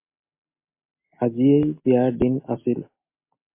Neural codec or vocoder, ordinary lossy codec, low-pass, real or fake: none; MP3, 24 kbps; 3.6 kHz; real